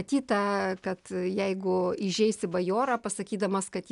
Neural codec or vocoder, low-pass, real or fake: none; 10.8 kHz; real